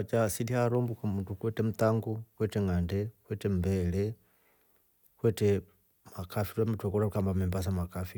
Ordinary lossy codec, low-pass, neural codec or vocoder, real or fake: none; none; none; real